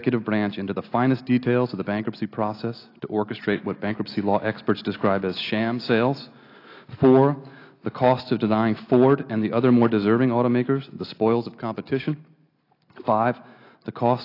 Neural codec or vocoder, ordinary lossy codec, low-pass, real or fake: none; AAC, 32 kbps; 5.4 kHz; real